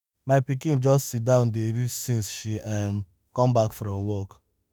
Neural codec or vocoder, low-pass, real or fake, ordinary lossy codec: autoencoder, 48 kHz, 32 numbers a frame, DAC-VAE, trained on Japanese speech; none; fake; none